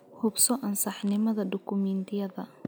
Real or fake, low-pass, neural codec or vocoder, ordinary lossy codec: real; none; none; none